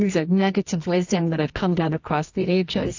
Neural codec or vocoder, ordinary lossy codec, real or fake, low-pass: codec, 24 kHz, 0.9 kbps, WavTokenizer, medium music audio release; AAC, 48 kbps; fake; 7.2 kHz